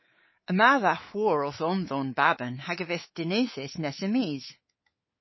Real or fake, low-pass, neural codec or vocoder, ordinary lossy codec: fake; 7.2 kHz; codec, 24 kHz, 3.1 kbps, DualCodec; MP3, 24 kbps